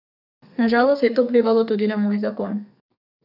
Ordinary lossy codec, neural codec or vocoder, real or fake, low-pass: none; codec, 32 kHz, 1.9 kbps, SNAC; fake; 5.4 kHz